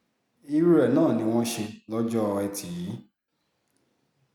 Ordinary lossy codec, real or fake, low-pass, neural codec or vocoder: none; real; 19.8 kHz; none